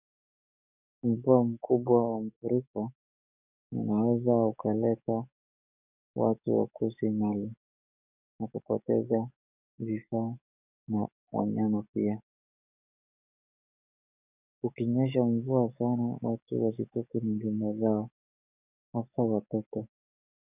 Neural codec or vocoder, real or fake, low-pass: codec, 44.1 kHz, 7.8 kbps, DAC; fake; 3.6 kHz